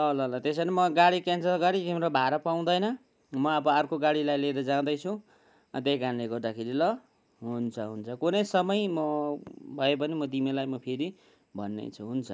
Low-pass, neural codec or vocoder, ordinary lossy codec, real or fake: none; none; none; real